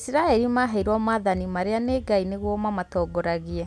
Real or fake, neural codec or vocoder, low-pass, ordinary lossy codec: real; none; none; none